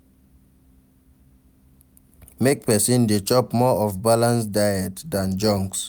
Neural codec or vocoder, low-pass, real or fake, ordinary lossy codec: none; none; real; none